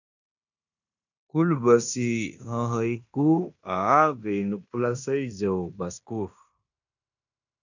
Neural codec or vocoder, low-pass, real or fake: codec, 16 kHz in and 24 kHz out, 0.9 kbps, LongCat-Audio-Codec, fine tuned four codebook decoder; 7.2 kHz; fake